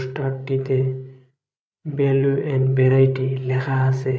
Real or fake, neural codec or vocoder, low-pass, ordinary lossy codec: fake; codec, 16 kHz, 16 kbps, FreqCodec, larger model; none; none